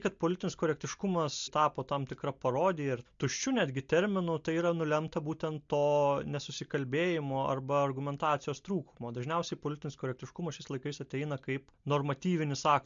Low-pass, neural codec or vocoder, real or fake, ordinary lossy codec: 7.2 kHz; none; real; MP3, 64 kbps